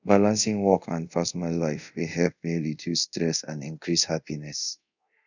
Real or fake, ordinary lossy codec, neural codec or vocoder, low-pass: fake; none; codec, 24 kHz, 0.5 kbps, DualCodec; 7.2 kHz